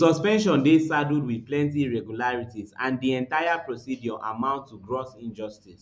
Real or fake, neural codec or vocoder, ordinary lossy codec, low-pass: real; none; none; none